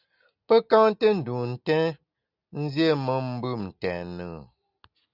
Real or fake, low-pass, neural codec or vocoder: real; 5.4 kHz; none